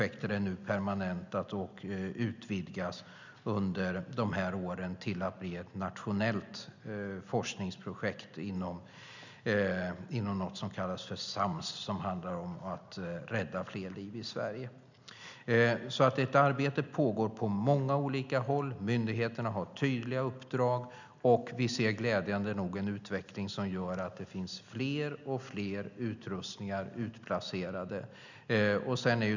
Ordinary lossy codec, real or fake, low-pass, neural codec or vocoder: none; real; 7.2 kHz; none